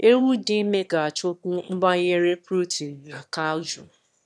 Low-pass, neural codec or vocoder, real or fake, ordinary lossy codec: none; autoencoder, 22.05 kHz, a latent of 192 numbers a frame, VITS, trained on one speaker; fake; none